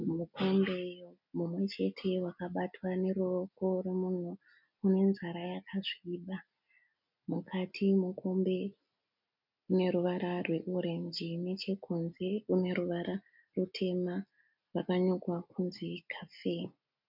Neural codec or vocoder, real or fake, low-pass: none; real; 5.4 kHz